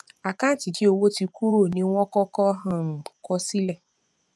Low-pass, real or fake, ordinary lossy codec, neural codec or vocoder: none; fake; none; vocoder, 24 kHz, 100 mel bands, Vocos